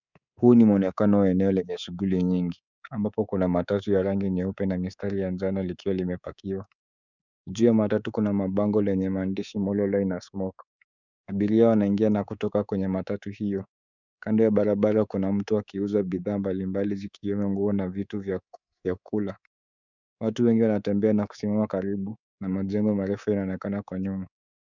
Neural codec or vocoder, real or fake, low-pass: codec, 24 kHz, 3.1 kbps, DualCodec; fake; 7.2 kHz